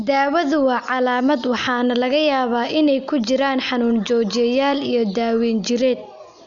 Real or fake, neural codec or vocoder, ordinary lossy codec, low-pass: real; none; none; 7.2 kHz